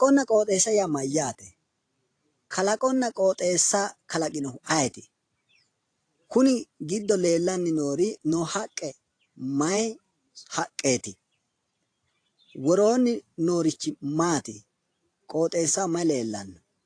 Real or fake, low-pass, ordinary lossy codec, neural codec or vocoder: fake; 9.9 kHz; AAC, 48 kbps; vocoder, 44.1 kHz, 128 mel bands every 512 samples, BigVGAN v2